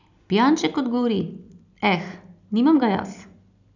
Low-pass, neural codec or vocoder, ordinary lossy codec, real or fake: 7.2 kHz; none; none; real